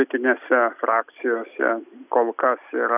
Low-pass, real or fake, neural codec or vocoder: 3.6 kHz; real; none